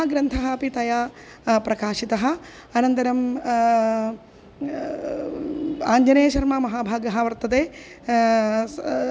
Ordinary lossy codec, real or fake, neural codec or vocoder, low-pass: none; real; none; none